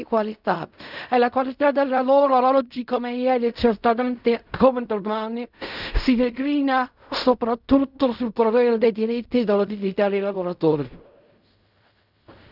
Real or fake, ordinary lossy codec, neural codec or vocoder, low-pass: fake; none; codec, 16 kHz in and 24 kHz out, 0.4 kbps, LongCat-Audio-Codec, fine tuned four codebook decoder; 5.4 kHz